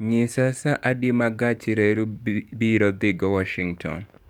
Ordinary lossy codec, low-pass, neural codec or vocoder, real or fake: none; 19.8 kHz; codec, 44.1 kHz, 7.8 kbps, DAC; fake